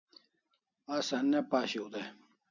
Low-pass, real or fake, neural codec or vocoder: 7.2 kHz; real; none